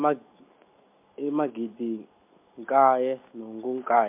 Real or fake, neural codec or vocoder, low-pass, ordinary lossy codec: real; none; 3.6 kHz; MP3, 32 kbps